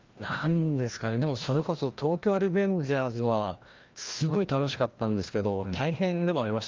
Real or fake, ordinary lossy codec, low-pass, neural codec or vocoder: fake; Opus, 32 kbps; 7.2 kHz; codec, 16 kHz, 1 kbps, FreqCodec, larger model